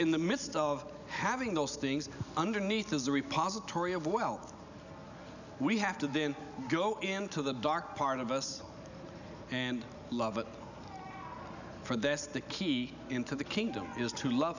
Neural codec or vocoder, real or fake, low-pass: none; real; 7.2 kHz